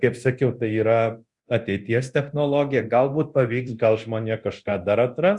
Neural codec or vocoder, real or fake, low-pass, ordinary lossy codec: codec, 24 kHz, 0.9 kbps, DualCodec; fake; 10.8 kHz; Opus, 64 kbps